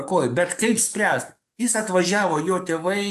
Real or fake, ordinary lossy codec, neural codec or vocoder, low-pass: fake; AAC, 96 kbps; codec, 44.1 kHz, 7.8 kbps, DAC; 14.4 kHz